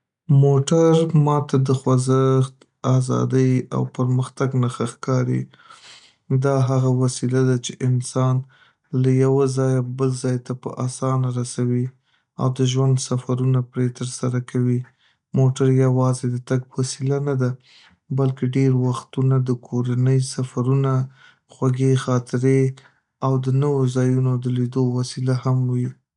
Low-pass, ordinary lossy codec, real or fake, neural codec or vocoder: 10.8 kHz; none; real; none